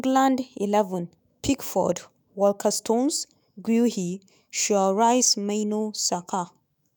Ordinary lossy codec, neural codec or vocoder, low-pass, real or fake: none; autoencoder, 48 kHz, 128 numbers a frame, DAC-VAE, trained on Japanese speech; none; fake